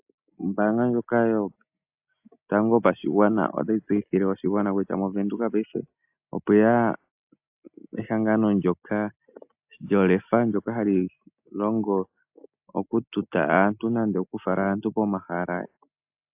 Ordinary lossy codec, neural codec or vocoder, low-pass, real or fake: AAC, 32 kbps; none; 3.6 kHz; real